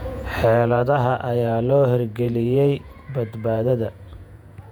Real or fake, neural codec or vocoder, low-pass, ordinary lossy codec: fake; vocoder, 48 kHz, 128 mel bands, Vocos; 19.8 kHz; none